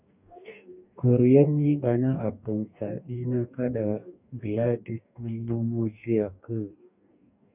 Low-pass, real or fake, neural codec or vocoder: 3.6 kHz; fake; codec, 44.1 kHz, 2.6 kbps, DAC